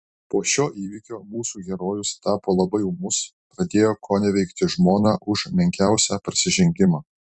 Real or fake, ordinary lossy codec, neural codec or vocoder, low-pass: fake; Opus, 64 kbps; vocoder, 44.1 kHz, 128 mel bands every 256 samples, BigVGAN v2; 10.8 kHz